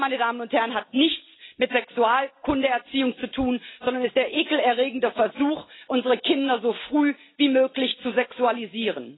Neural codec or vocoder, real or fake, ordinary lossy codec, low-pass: none; real; AAC, 16 kbps; 7.2 kHz